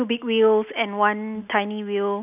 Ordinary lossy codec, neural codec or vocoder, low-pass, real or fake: none; none; 3.6 kHz; real